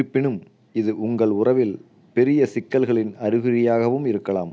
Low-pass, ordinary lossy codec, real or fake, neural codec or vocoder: none; none; real; none